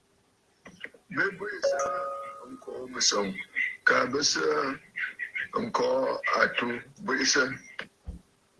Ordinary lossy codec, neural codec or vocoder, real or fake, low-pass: Opus, 16 kbps; none; real; 10.8 kHz